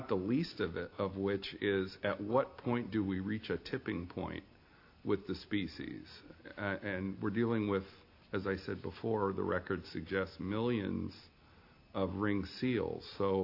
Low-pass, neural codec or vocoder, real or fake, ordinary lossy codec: 5.4 kHz; none; real; AAC, 32 kbps